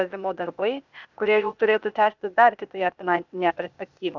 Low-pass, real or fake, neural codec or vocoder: 7.2 kHz; fake; codec, 16 kHz, 0.8 kbps, ZipCodec